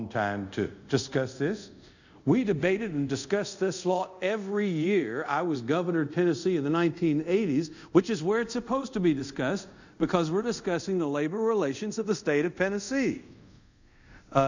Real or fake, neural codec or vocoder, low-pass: fake; codec, 24 kHz, 0.5 kbps, DualCodec; 7.2 kHz